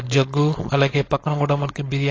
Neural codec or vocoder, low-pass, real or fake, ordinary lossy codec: codec, 16 kHz, 4.8 kbps, FACodec; 7.2 kHz; fake; AAC, 32 kbps